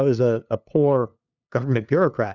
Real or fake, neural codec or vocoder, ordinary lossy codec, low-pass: fake; codec, 16 kHz, 2 kbps, FunCodec, trained on LibriTTS, 25 frames a second; Opus, 64 kbps; 7.2 kHz